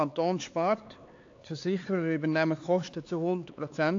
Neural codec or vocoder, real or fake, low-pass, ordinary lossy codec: codec, 16 kHz, 4 kbps, X-Codec, HuBERT features, trained on LibriSpeech; fake; 7.2 kHz; AAC, 48 kbps